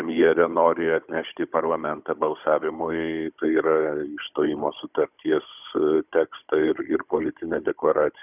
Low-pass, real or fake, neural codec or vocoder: 3.6 kHz; fake; codec, 16 kHz, 16 kbps, FunCodec, trained on Chinese and English, 50 frames a second